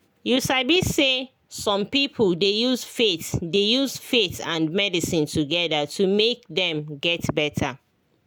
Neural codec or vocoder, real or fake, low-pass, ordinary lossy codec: none; real; none; none